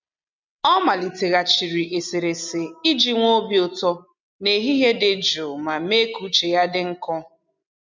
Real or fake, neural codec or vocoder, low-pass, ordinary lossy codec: real; none; 7.2 kHz; MP3, 64 kbps